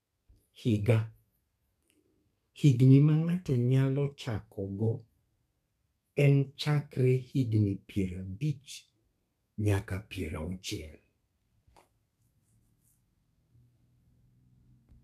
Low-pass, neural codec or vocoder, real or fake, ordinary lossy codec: 14.4 kHz; codec, 32 kHz, 1.9 kbps, SNAC; fake; none